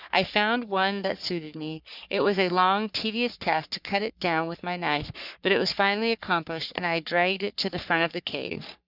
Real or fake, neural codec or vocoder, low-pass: fake; codec, 44.1 kHz, 3.4 kbps, Pupu-Codec; 5.4 kHz